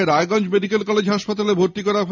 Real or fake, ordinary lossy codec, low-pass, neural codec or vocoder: real; none; 7.2 kHz; none